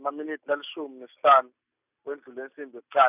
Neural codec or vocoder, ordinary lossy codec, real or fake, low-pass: none; none; real; 3.6 kHz